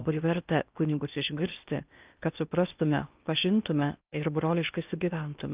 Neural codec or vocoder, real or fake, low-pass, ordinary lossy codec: codec, 16 kHz in and 24 kHz out, 0.8 kbps, FocalCodec, streaming, 65536 codes; fake; 3.6 kHz; Opus, 24 kbps